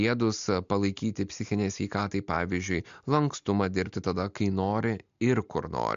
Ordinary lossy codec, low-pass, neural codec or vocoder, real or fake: MP3, 64 kbps; 7.2 kHz; none; real